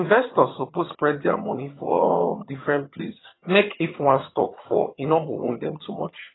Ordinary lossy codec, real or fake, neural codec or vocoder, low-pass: AAC, 16 kbps; fake; vocoder, 22.05 kHz, 80 mel bands, HiFi-GAN; 7.2 kHz